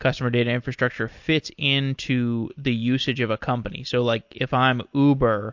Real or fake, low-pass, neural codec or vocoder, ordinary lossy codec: real; 7.2 kHz; none; MP3, 48 kbps